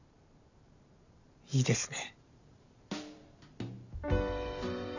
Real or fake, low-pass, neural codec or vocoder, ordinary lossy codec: fake; 7.2 kHz; vocoder, 44.1 kHz, 128 mel bands every 512 samples, BigVGAN v2; none